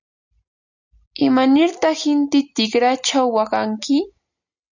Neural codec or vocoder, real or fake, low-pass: none; real; 7.2 kHz